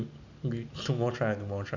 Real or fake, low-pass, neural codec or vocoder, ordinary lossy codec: real; 7.2 kHz; none; none